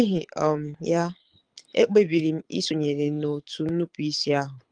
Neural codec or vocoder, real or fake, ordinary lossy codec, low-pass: codec, 24 kHz, 6 kbps, HILCodec; fake; Opus, 32 kbps; 9.9 kHz